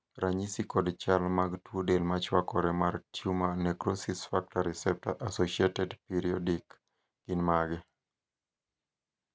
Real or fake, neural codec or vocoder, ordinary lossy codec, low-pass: real; none; none; none